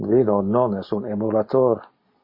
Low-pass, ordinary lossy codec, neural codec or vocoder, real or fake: 5.4 kHz; MP3, 24 kbps; none; real